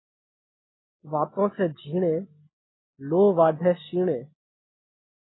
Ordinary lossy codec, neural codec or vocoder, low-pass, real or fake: AAC, 16 kbps; none; 7.2 kHz; real